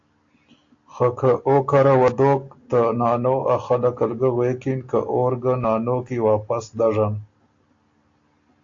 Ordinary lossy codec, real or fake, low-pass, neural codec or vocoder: AAC, 48 kbps; real; 7.2 kHz; none